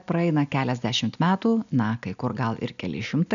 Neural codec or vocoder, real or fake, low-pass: none; real; 7.2 kHz